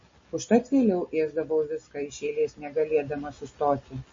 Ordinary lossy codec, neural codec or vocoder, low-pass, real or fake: MP3, 32 kbps; none; 7.2 kHz; real